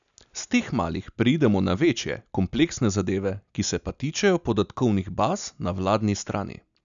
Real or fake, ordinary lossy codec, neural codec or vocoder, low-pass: real; none; none; 7.2 kHz